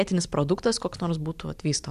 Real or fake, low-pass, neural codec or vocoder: real; 14.4 kHz; none